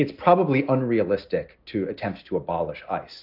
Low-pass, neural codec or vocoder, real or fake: 5.4 kHz; none; real